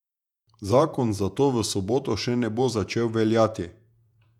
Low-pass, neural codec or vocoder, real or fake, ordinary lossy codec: 19.8 kHz; vocoder, 48 kHz, 128 mel bands, Vocos; fake; none